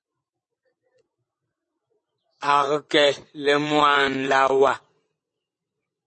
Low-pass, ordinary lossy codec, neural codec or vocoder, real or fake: 9.9 kHz; MP3, 32 kbps; vocoder, 22.05 kHz, 80 mel bands, WaveNeXt; fake